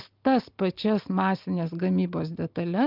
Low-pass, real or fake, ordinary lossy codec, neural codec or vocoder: 5.4 kHz; real; Opus, 24 kbps; none